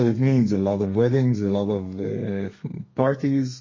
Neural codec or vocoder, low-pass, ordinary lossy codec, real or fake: codec, 32 kHz, 1.9 kbps, SNAC; 7.2 kHz; MP3, 32 kbps; fake